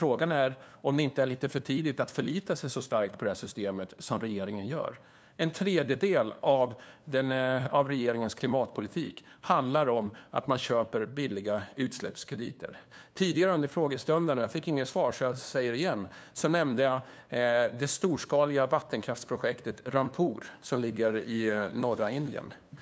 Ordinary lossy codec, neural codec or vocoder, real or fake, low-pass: none; codec, 16 kHz, 2 kbps, FunCodec, trained on LibriTTS, 25 frames a second; fake; none